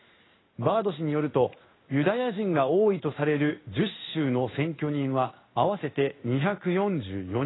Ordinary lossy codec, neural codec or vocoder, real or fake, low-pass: AAC, 16 kbps; codec, 16 kHz in and 24 kHz out, 1 kbps, XY-Tokenizer; fake; 7.2 kHz